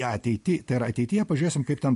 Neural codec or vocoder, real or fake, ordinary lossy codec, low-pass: none; real; MP3, 48 kbps; 14.4 kHz